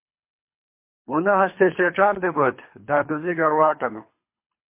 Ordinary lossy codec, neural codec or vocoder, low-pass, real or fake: MP3, 24 kbps; codec, 24 kHz, 3 kbps, HILCodec; 3.6 kHz; fake